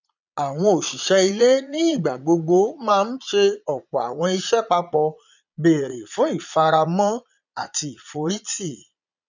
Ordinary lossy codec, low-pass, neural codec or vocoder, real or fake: none; 7.2 kHz; vocoder, 22.05 kHz, 80 mel bands, Vocos; fake